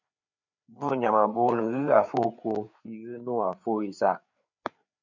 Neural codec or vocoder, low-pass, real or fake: codec, 16 kHz, 4 kbps, FreqCodec, larger model; 7.2 kHz; fake